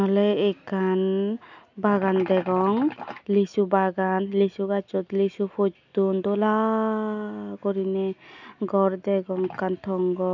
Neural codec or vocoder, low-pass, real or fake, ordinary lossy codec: none; 7.2 kHz; real; none